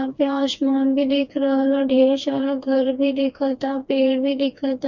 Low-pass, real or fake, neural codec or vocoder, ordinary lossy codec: 7.2 kHz; fake; codec, 16 kHz, 2 kbps, FreqCodec, smaller model; none